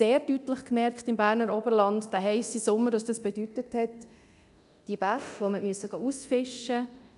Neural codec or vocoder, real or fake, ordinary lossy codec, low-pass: codec, 24 kHz, 0.9 kbps, DualCodec; fake; none; 10.8 kHz